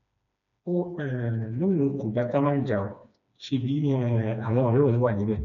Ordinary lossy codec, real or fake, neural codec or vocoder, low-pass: none; fake; codec, 16 kHz, 2 kbps, FreqCodec, smaller model; 7.2 kHz